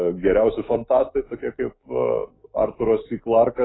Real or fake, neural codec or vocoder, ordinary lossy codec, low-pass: fake; autoencoder, 48 kHz, 128 numbers a frame, DAC-VAE, trained on Japanese speech; AAC, 16 kbps; 7.2 kHz